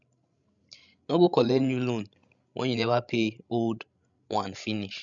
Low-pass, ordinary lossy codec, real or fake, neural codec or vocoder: 7.2 kHz; MP3, 96 kbps; fake; codec, 16 kHz, 16 kbps, FreqCodec, larger model